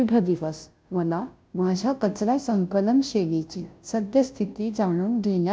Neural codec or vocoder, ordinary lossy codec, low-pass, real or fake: codec, 16 kHz, 0.5 kbps, FunCodec, trained on Chinese and English, 25 frames a second; none; none; fake